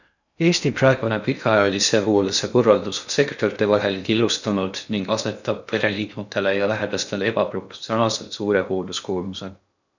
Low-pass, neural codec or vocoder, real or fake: 7.2 kHz; codec, 16 kHz in and 24 kHz out, 0.6 kbps, FocalCodec, streaming, 2048 codes; fake